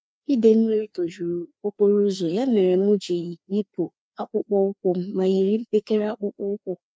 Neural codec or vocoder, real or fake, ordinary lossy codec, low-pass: codec, 16 kHz, 2 kbps, FreqCodec, larger model; fake; none; none